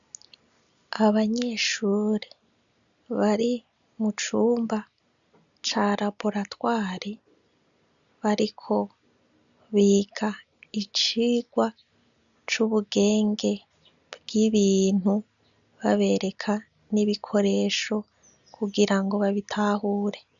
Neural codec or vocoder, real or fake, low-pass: none; real; 7.2 kHz